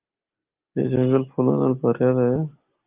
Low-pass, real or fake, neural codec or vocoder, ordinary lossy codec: 3.6 kHz; real; none; Opus, 32 kbps